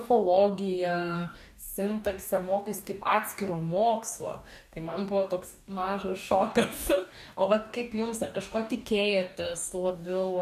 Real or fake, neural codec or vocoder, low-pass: fake; codec, 44.1 kHz, 2.6 kbps, DAC; 14.4 kHz